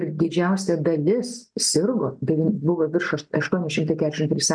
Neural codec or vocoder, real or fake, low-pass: none; real; 9.9 kHz